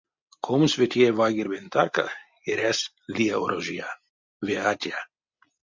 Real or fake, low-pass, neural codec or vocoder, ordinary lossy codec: real; 7.2 kHz; none; MP3, 64 kbps